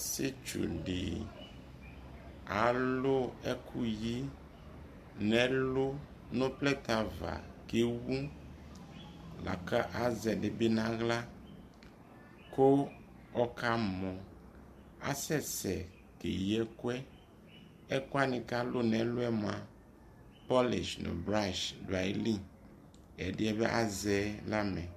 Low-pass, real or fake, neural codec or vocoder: 14.4 kHz; real; none